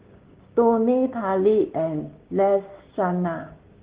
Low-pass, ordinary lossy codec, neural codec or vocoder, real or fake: 3.6 kHz; Opus, 16 kbps; codec, 16 kHz, 6 kbps, DAC; fake